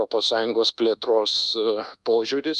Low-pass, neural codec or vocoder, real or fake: 10.8 kHz; codec, 24 kHz, 1.2 kbps, DualCodec; fake